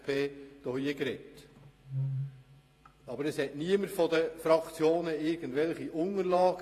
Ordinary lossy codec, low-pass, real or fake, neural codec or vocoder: AAC, 48 kbps; 14.4 kHz; fake; vocoder, 48 kHz, 128 mel bands, Vocos